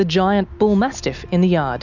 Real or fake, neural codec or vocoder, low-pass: real; none; 7.2 kHz